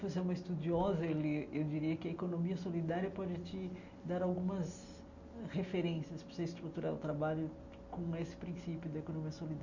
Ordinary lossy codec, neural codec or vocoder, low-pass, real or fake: none; none; 7.2 kHz; real